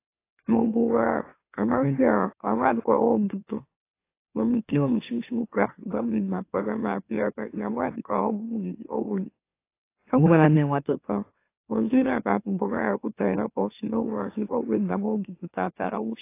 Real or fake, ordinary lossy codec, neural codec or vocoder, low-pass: fake; AAC, 24 kbps; autoencoder, 44.1 kHz, a latent of 192 numbers a frame, MeloTTS; 3.6 kHz